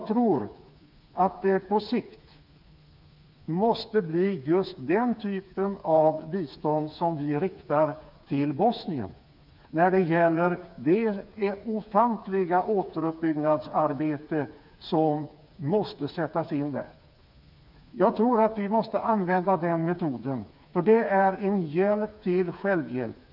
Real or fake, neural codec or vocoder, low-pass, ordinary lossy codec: fake; codec, 16 kHz, 4 kbps, FreqCodec, smaller model; 5.4 kHz; none